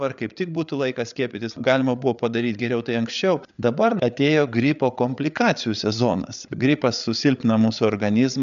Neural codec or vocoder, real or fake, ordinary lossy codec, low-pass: codec, 16 kHz, 8 kbps, FreqCodec, larger model; fake; MP3, 96 kbps; 7.2 kHz